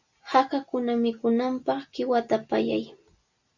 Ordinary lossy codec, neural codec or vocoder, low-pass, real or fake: Opus, 64 kbps; none; 7.2 kHz; real